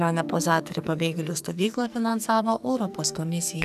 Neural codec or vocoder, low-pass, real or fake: codec, 44.1 kHz, 2.6 kbps, SNAC; 14.4 kHz; fake